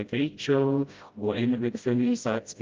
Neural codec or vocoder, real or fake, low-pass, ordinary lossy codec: codec, 16 kHz, 0.5 kbps, FreqCodec, smaller model; fake; 7.2 kHz; Opus, 24 kbps